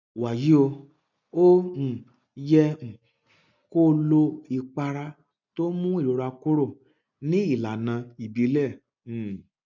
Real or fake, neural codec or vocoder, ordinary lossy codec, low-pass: real; none; none; 7.2 kHz